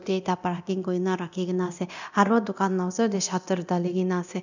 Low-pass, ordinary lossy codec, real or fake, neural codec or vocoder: 7.2 kHz; none; fake; codec, 24 kHz, 0.9 kbps, DualCodec